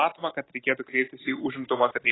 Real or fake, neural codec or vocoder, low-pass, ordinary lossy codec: real; none; 7.2 kHz; AAC, 16 kbps